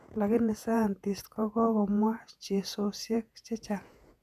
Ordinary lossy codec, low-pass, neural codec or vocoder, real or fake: none; 14.4 kHz; vocoder, 48 kHz, 128 mel bands, Vocos; fake